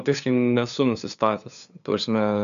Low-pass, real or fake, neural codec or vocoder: 7.2 kHz; fake; codec, 16 kHz, 2 kbps, FunCodec, trained on LibriTTS, 25 frames a second